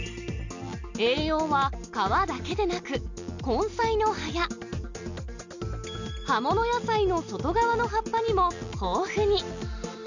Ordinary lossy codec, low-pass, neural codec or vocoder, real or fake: none; 7.2 kHz; none; real